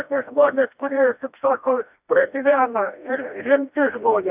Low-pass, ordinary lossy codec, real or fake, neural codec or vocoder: 3.6 kHz; AAC, 32 kbps; fake; codec, 16 kHz, 1 kbps, FreqCodec, smaller model